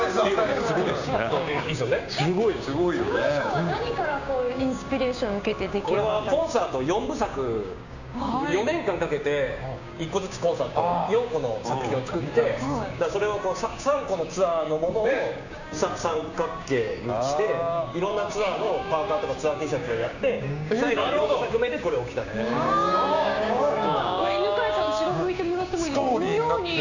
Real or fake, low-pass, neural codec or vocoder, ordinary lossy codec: fake; 7.2 kHz; codec, 16 kHz, 6 kbps, DAC; none